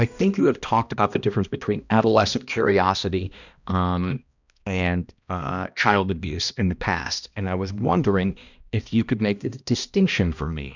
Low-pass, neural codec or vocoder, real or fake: 7.2 kHz; codec, 16 kHz, 1 kbps, X-Codec, HuBERT features, trained on balanced general audio; fake